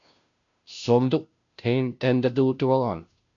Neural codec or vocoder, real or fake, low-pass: codec, 16 kHz, 0.5 kbps, FunCodec, trained on Chinese and English, 25 frames a second; fake; 7.2 kHz